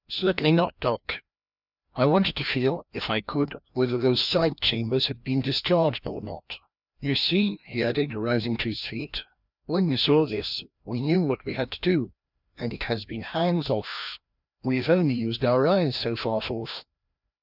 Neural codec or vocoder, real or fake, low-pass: codec, 16 kHz, 1 kbps, FreqCodec, larger model; fake; 5.4 kHz